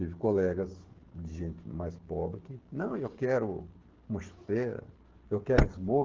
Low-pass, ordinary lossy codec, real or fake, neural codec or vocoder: 7.2 kHz; Opus, 16 kbps; fake; codec, 16 kHz, 8 kbps, FreqCodec, smaller model